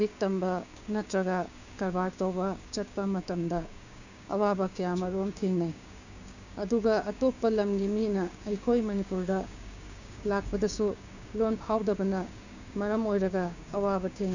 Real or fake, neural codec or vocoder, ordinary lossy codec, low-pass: fake; codec, 16 kHz, 6 kbps, DAC; none; 7.2 kHz